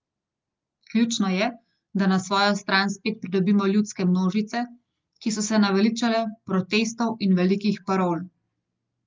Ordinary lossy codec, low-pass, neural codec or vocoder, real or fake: Opus, 24 kbps; 7.2 kHz; none; real